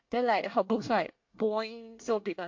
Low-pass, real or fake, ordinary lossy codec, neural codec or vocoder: 7.2 kHz; fake; MP3, 48 kbps; codec, 24 kHz, 1 kbps, SNAC